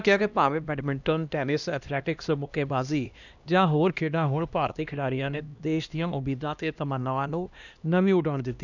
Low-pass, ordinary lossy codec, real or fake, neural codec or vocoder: 7.2 kHz; none; fake; codec, 16 kHz, 1 kbps, X-Codec, HuBERT features, trained on LibriSpeech